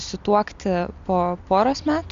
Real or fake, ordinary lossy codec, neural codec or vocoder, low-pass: real; MP3, 64 kbps; none; 7.2 kHz